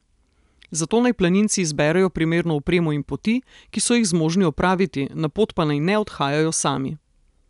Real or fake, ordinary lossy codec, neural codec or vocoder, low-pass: real; none; none; 10.8 kHz